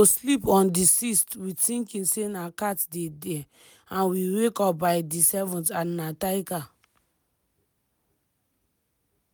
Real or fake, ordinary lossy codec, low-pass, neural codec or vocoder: real; none; none; none